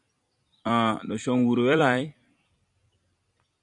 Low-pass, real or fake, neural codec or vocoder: 10.8 kHz; fake; vocoder, 44.1 kHz, 128 mel bands every 256 samples, BigVGAN v2